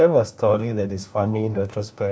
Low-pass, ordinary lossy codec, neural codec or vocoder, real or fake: none; none; codec, 16 kHz, 1 kbps, FunCodec, trained on LibriTTS, 50 frames a second; fake